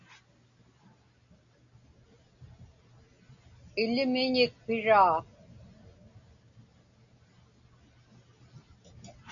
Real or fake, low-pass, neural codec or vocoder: real; 7.2 kHz; none